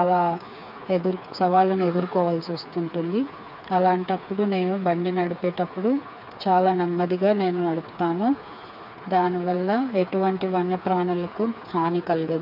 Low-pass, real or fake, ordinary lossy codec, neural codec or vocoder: 5.4 kHz; fake; AAC, 48 kbps; codec, 16 kHz, 4 kbps, FreqCodec, smaller model